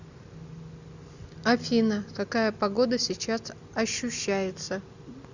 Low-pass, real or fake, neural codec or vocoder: 7.2 kHz; real; none